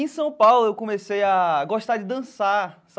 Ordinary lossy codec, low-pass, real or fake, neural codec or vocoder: none; none; real; none